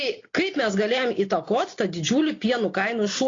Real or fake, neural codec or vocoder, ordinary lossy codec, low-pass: real; none; AAC, 32 kbps; 7.2 kHz